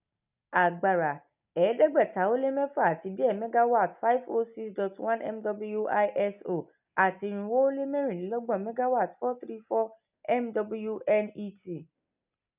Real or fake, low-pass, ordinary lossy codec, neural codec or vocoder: real; 3.6 kHz; none; none